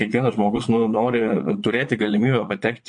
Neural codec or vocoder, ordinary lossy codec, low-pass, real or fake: vocoder, 22.05 kHz, 80 mel bands, Vocos; MP3, 48 kbps; 9.9 kHz; fake